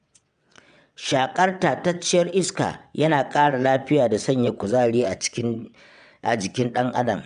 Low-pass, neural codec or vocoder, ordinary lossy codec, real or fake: 9.9 kHz; vocoder, 22.05 kHz, 80 mel bands, WaveNeXt; none; fake